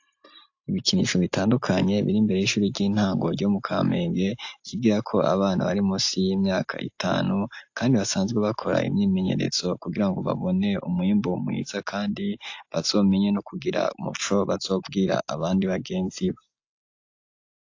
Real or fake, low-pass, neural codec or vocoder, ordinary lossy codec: real; 7.2 kHz; none; AAC, 48 kbps